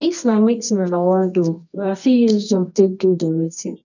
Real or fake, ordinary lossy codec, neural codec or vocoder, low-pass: fake; none; codec, 24 kHz, 0.9 kbps, WavTokenizer, medium music audio release; 7.2 kHz